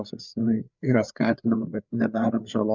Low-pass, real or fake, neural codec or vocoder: 7.2 kHz; fake; codec, 16 kHz, 4 kbps, FreqCodec, larger model